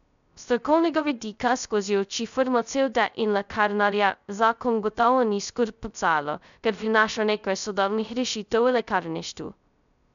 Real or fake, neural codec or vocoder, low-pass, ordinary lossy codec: fake; codec, 16 kHz, 0.2 kbps, FocalCodec; 7.2 kHz; MP3, 96 kbps